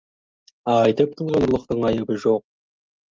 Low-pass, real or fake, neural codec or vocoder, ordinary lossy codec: 7.2 kHz; real; none; Opus, 32 kbps